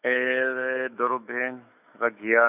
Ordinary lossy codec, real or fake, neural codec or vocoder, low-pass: none; fake; autoencoder, 48 kHz, 128 numbers a frame, DAC-VAE, trained on Japanese speech; 3.6 kHz